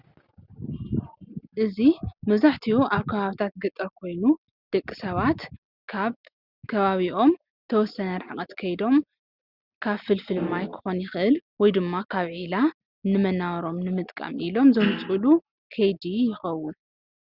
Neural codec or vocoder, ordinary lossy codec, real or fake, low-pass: none; Opus, 64 kbps; real; 5.4 kHz